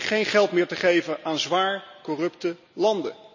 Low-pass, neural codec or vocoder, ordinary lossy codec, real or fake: 7.2 kHz; none; none; real